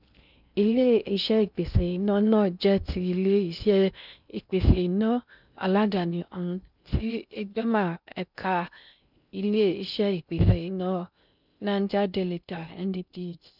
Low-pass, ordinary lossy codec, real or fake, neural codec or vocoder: 5.4 kHz; none; fake; codec, 16 kHz in and 24 kHz out, 0.6 kbps, FocalCodec, streaming, 2048 codes